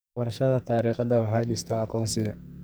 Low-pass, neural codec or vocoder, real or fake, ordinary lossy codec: none; codec, 44.1 kHz, 2.6 kbps, SNAC; fake; none